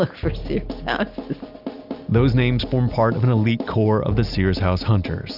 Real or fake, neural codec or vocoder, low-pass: real; none; 5.4 kHz